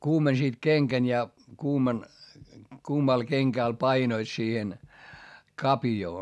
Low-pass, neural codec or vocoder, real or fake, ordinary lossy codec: none; none; real; none